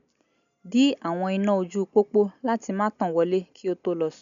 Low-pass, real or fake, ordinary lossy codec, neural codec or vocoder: 7.2 kHz; real; MP3, 96 kbps; none